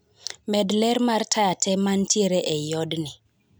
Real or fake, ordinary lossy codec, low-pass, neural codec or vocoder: real; none; none; none